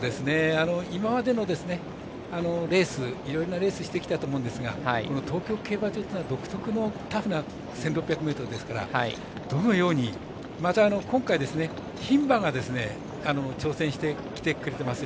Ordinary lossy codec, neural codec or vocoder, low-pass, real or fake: none; none; none; real